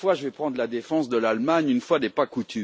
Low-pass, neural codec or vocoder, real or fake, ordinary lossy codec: none; none; real; none